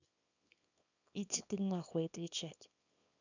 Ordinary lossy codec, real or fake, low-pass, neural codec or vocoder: none; fake; 7.2 kHz; codec, 24 kHz, 0.9 kbps, WavTokenizer, small release